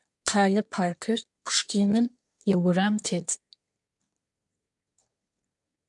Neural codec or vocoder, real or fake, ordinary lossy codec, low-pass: codec, 24 kHz, 1 kbps, SNAC; fake; MP3, 96 kbps; 10.8 kHz